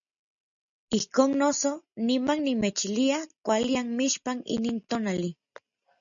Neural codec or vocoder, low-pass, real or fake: none; 7.2 kHz; real